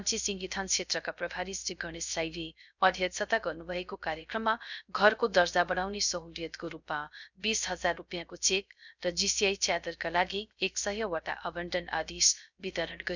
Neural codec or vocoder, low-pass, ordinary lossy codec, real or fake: codec, 16 kHz, 0.3 kbps, FocalCodec; 7.2 kHz; none; fake